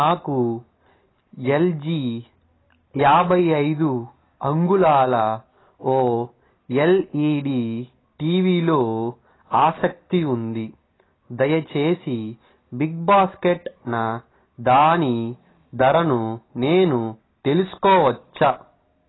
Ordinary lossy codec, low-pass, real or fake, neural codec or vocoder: AAC, 16 kbps; 7.2 kHz; real; none